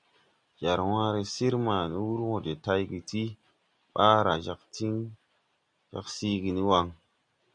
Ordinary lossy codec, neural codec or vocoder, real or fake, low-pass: Opus, 64 kbps; none; real; 9.9 kHz